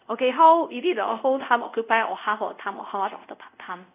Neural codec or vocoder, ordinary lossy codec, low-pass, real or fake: codec, 24 kHz, 0.5 kbps, DualCodec; none; 3.6 kHz; fake